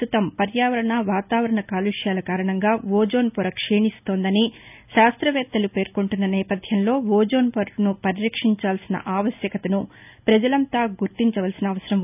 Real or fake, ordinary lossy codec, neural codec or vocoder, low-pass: real; none; none; 3.6 kHz